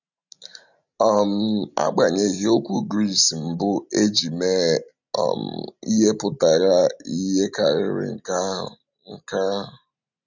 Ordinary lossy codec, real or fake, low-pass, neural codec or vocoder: none; real; 7.2 kHz; none